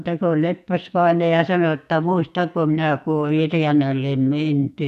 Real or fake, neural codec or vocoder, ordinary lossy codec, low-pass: fake; codec, 44.1 kHz, 2.6 kbps, SNAC; none; 14.4 kHz